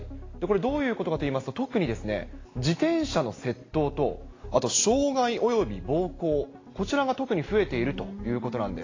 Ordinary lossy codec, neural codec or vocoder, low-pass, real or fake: AAC, 32 kbps; none; 7.2 kHz; real